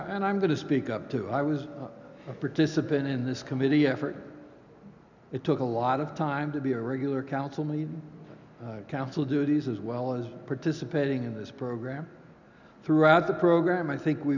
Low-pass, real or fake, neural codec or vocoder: 7.2 kHz; real; none